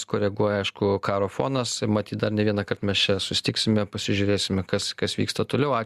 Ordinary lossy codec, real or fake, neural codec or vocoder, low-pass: Opus, 64 kbps; real; none; 14.4 kHz